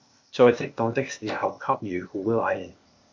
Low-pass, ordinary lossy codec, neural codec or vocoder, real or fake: 7.2 kHz; MP3, 64 kbps; codec, 16 kHz, 0.8 kbps, ZipCodec; fake